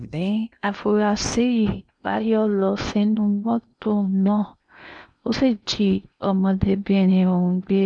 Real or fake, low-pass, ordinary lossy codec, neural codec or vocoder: fake; 9.9 kHz; none; codec, 16 kHz in and 24 kHz out, 0.8 kbps, FocalCodec, streaming, 65536 codes